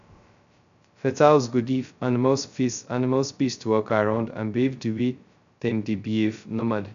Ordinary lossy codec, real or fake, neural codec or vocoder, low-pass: AAC, 96 kbps; fake; codec, 16 kHz, 0.2 kbps, FocalCodec; 7.2 kHz